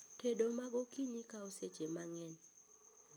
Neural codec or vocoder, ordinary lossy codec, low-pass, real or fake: none; none; none; real